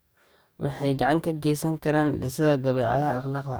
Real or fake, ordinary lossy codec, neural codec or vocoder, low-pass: fake; none; codec, 44.1 kHz, 2.6 kbps, DAC; none